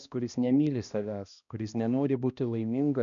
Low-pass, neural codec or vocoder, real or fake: 7.2 kHz; codec, 16 kHz, 1 kbps, X-Codec, HuBERT features, trained on balanced general audio; fake